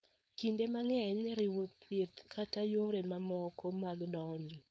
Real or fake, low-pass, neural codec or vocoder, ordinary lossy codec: fake; none; codec, 16 kHz, 4.8 kbps, FACodec; none